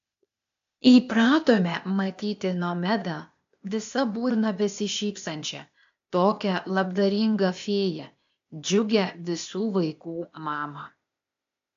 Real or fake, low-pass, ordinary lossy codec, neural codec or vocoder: fake; 7.2 kHz; AAC, 64 kbps; codec, 16 kHz, 0.8 kbps, ZipCodec